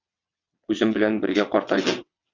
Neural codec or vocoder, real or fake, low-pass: vocoder, 22.05 kHz, 80 mel bands, WaveNeXt; fake; 7.2 kHz